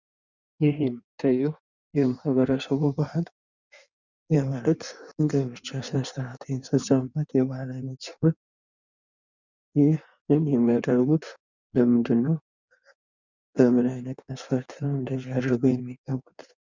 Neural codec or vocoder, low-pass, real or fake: codec, 16 kHz in and 24 kHz out, 1.1 kbps, FireRedTTS-2 codec; 7.2 kHz; fake